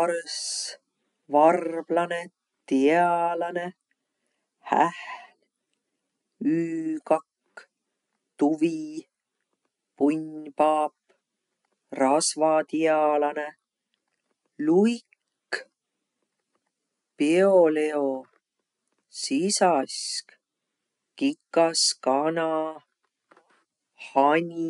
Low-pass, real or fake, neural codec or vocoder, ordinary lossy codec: 10.8 kHz; real; none; none